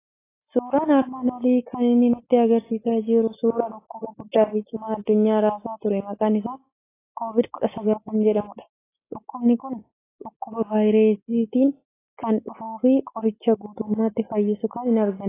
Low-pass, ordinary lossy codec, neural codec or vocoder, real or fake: 3.6 kHz; AAC, 16 kbps; none; real